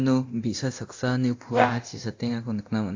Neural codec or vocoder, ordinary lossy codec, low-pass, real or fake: codec, 24 kHz, 0.9 kbps, DualCodec; AAC, 48 kbps; 7.2 kHz; fake